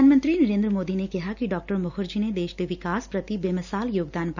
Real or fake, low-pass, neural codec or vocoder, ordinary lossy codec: real; 7.2 kHz; none; none